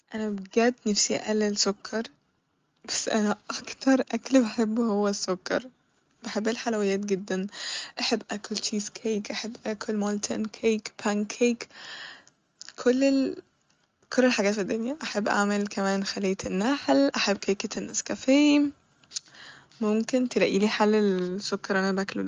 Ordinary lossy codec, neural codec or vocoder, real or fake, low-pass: Opus, 32 kbps; none; real; 7.2 kHz